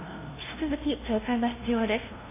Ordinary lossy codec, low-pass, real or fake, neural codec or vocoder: AAC, 16 kbps; 3.6 kHz; fake; codec, 16 kHz, 0.5 kbps, FunCodec, trained on LibriTTS, 25 frames a second